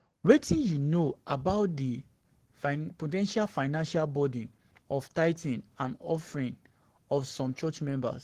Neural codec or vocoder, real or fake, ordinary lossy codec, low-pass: codec, 44.1 kHz, 7.8 kbps, Pupu-Codec; fake; Opus, 16 kbps; 14.4 kHz